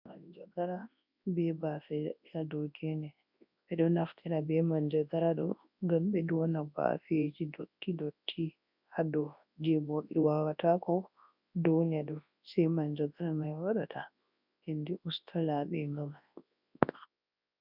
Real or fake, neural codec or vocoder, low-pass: fake; codec, 24 kHz, 0.9 kbps, WavTokenizer, large speech release; 5.4 kHz